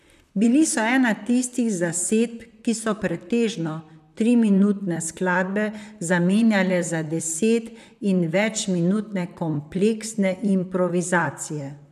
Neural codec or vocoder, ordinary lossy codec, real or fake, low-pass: vocoder, 44.1 kHz, 128 mel bands, Pupu-Vocoder; none; fake; 14.4 kHz